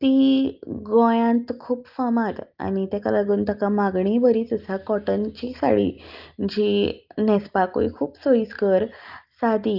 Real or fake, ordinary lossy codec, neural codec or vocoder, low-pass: real; Opus, 32 kbps; none; 5.4 kHz